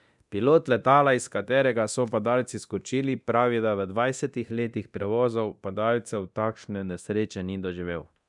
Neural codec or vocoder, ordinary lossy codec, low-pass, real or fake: codec, 24 kHz, 0.9 kbps, DualCodec; none; 10.8 kHz; fake